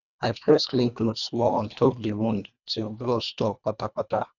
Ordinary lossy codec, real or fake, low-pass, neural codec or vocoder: none; fake; 7.2 kHz; codec, 24 kHz, 1.5 kbps, HILCodec